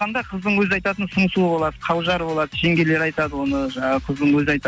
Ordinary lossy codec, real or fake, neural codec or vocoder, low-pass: none; real; none; none